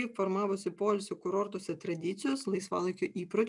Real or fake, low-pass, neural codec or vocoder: real; 10.8 kHz; none